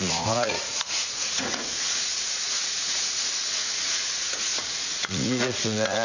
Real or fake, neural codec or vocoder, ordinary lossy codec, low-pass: fake; vocoder, 44.1 kHz, 80 mel bands, Vocos; none; 7.2 kHz